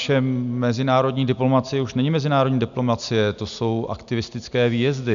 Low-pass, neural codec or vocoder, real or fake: 7.2 kHz; none; real